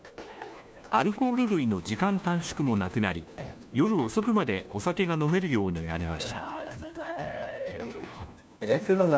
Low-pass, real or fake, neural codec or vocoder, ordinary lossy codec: none; fake; codec, 16 kHz, 1 kbps, FunCodec, trained on LibriTTS, 50 frames a second; none